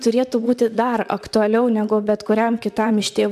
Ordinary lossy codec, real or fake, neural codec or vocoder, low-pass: MP3, 96 kbps; fake; vocoder, 44.1 kHz, 128 mel bands, Pupu-Vocoder; 14.4 kHz